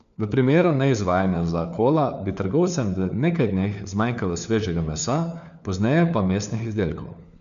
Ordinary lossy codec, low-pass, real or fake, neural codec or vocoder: none; 7.2 kHz; fake; codec, 16 kHz, 4 kbps, FunCodec, trained on LibriTTS, 50 frames a second